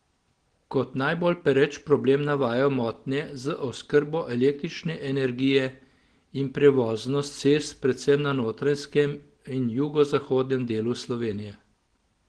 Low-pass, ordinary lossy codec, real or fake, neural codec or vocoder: 9.9 kHz; Opus, 16 kbps; real; none